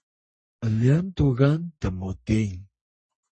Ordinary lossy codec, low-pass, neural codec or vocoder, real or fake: MP3, 32 kbps; 10.8 kHz; codec, 44.1 kHz, 3.4 kbps, Pupu-Codec; fake